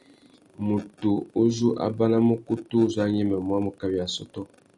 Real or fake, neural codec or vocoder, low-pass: real; none; 10.8 kHz